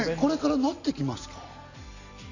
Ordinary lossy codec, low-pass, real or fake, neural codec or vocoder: none; 7.2 kHz; real; none